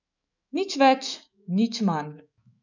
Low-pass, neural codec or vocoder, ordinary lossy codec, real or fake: 7.2 kHz; autoencoder, 48 kHz, 128 numbers a frame, DAC-VAE, trained on Japanese speech; none; fake